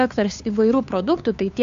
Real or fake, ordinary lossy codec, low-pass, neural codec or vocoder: fake; MP3, 64 kbps; 7.2 kHz; codec, 16 kHz, 2 kbps, FunCodec, trained on Chinese and English, 25 frames a second